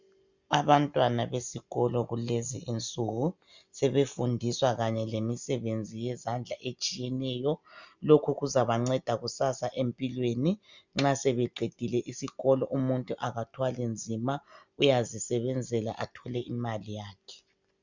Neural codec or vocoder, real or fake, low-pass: none; real; 7.2 kHz